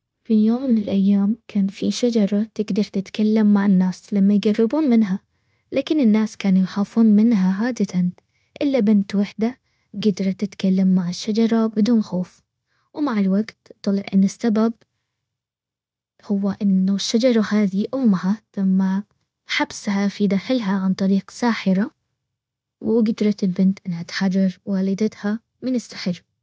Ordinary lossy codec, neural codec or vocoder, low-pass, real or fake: none; codec, 16 kHz, 0.9 kbps, LongCat-Audio-Codec; none; fake